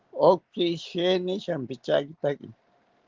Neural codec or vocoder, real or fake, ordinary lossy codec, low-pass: none; real; Opus, 16 kbps; 7.2 kHz